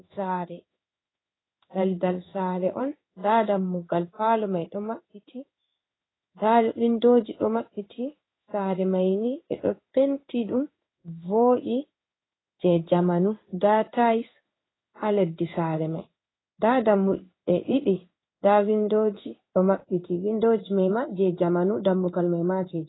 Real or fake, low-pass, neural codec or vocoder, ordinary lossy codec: fake; 7.2 kHz; codec, 16 kHz in and 24 kHz out, 1 kbps, XY-Tokenizer; AAC, 16 kbps